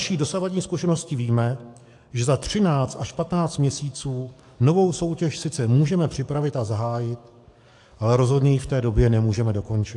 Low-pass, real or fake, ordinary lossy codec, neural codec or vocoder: 10.8 kHz; fake; AAC, 64 kbps; codec, 44.1 kHz, 7.8 kbps, DAC